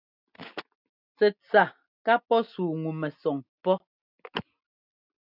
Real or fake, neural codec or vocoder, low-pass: real; none; 5.4 kHz